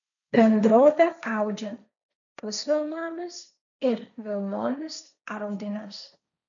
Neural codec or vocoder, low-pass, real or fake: codec, 16 kHz, 1.1 kbps, Voila-Tokenizer; 7.2 kHz; fake